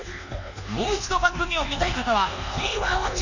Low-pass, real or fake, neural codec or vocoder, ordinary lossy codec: 7.2 kHz; fake; codec, 24 kHz, 1.2 kbps, DualCodec; none